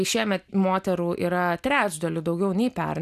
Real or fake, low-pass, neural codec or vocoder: real; 14.4 kHz; none